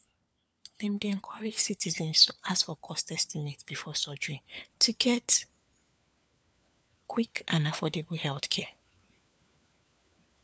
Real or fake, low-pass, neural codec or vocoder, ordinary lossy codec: fake; none; codec, 16 kHz, 4 kbps, FunCodec, trained on LibriTTS, 50 frames a second; none